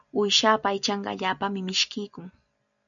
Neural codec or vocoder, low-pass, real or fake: none; 7.2 kHz; real